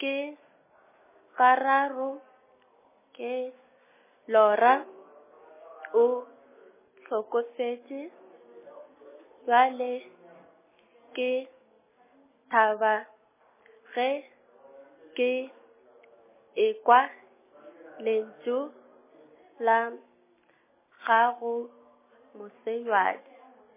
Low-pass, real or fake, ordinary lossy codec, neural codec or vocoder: 3.6 kHz; real; MP3, 16 kbps; none